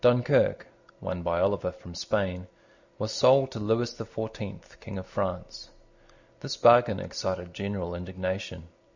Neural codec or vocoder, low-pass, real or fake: none; 7.2 kHz; real